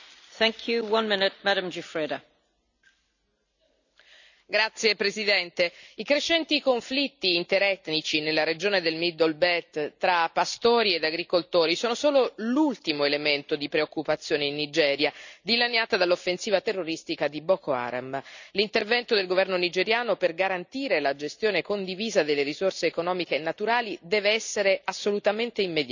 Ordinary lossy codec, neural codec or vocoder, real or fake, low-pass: none; none; real; 7.2 kHz